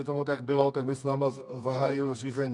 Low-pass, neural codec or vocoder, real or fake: 10.8 kHz; codec, 24 kHz, 0.9 kbps, WavTokenizer, medium music audio release; fake